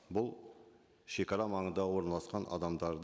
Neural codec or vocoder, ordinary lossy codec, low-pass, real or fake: none; none; none; real